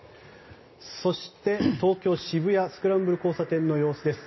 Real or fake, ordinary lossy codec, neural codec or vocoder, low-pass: real; MP3, 24 kbps; none; 7.2 kHz